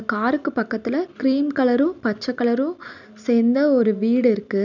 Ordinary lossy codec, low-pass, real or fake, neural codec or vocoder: none; 7.2 kHz; real; none